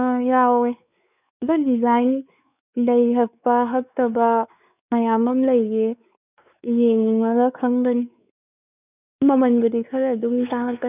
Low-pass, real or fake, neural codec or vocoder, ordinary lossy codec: 3.6 kHz; fake; codec, 16 kHz, 4 kbps, X-Codec, WavLM features, trained on Multilingual LibriSpeech; none